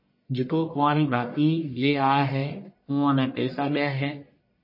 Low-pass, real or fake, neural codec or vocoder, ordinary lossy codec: 5.4 kHz; fake; codec, 44.1 kHz, 1.7 kbps, Pupu-Codec; MP3, 24 kbps